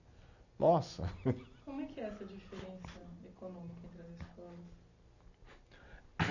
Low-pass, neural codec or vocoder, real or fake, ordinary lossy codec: 7.2 kHz; none; real; none